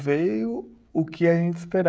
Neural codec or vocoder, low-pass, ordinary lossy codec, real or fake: codec, 16 kHz, 16 kbps, FreqCodec, smaller model; none; none; fake